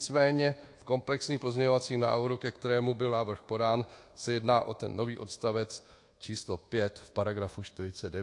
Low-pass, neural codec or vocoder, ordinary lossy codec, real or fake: 10.8 kHz; codec, 24 kHz, 1.2 kbps, DualCodec; AAC, 48 kbps; fake